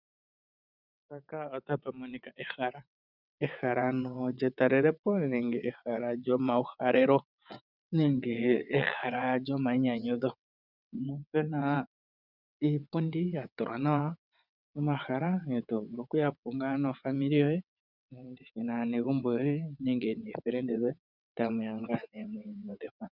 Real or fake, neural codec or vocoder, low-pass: fake; vocoder, 22.05 kHz, 80 mel bands, WaveNeXt; 5.4 kHz